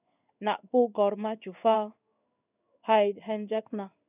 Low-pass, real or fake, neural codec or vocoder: 3.6 kHz; fake; codec, 16 kHz in and 24 kHz out, 1 kbps, XY-Tokenizer